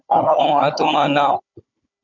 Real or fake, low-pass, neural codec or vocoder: fake; 7.2 kHz; codec, 16 kHz, 16 kbps, FunCodec, trained on Chinese and English, 50 frames a second